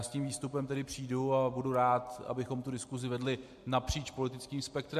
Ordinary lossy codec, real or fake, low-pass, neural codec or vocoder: MP3, 64 kbps; real; 14.4 kHz; none